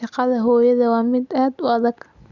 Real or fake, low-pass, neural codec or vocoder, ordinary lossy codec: real; 7.2 kHz; none; Opus, 64 kbps